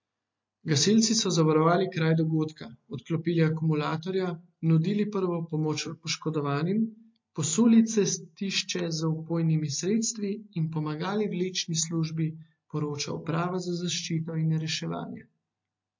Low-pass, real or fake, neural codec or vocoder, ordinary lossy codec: 7.2 kHz; real; none; MP3, 48 kbps